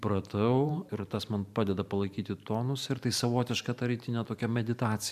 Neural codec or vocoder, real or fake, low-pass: vocoder, 44.1 kHz, 128 mel bands every 512 samples, BigVGAN v2; fake; 14.4 kHz